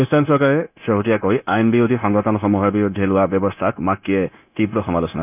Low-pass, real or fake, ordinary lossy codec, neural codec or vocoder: 3.6 kHz; fake; none; codec, 16 kHz, 0.9 kbps, LongCat-Audio-Codec